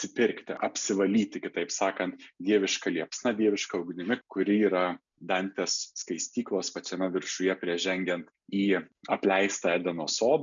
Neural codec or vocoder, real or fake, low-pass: none; real; 7.2 kHz